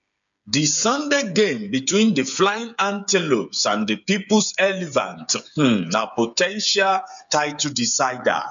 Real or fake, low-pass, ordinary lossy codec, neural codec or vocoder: fake; 7.2 kHz; MP3, 96 kbps; codec, 16 kHz, 8 kbps, FreqCodec, smaller model